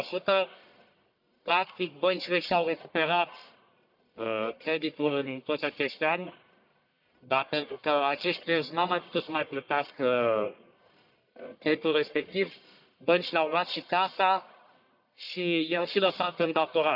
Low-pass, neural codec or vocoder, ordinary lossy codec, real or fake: 5.4 kHz; codec, 44.1 kHz, 1.7 kbps, Pupu-Codec; none; fake